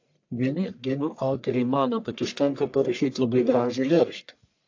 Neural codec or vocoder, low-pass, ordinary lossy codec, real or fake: codec, 44.1 kHz, 1.7 kbps, Pupu-Codec; 7.2 kHz; AAC, 48 kbps; fake